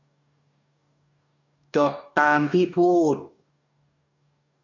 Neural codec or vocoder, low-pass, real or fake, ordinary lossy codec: codec, 44.1 kHz, 2.6 kbps, DAC; 7.2 kHz; fake; none